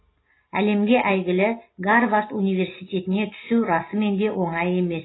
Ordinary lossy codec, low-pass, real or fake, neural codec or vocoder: AAC, 16 kbps; 7.2 kHz; real; none